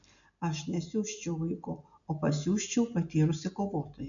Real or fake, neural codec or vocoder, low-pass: real; none; 7.2 kHz